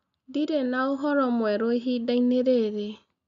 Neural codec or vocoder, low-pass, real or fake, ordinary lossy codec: none; 7.2 kHz; real; AAC, 96 kbps